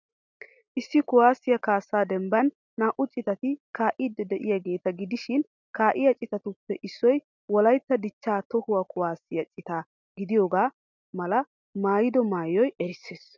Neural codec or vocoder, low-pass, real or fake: none; 7.2 kHz; real